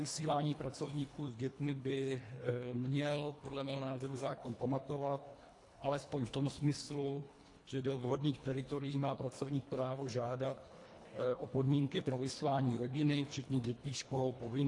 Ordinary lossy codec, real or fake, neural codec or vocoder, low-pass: AAC, 48 kbps; fake; codec, 24 kHz, 1.5 kbps, HILCodec; 10.8 kHz